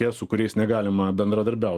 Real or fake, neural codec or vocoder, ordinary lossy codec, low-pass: real; none; Opus, 24 kbps; 14.4 kHz